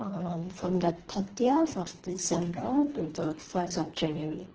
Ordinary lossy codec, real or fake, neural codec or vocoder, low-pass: Opus, 16 kbps; fake; codec, 24 kHz, 1.5 kbps, HILCodec; 7.2 kHz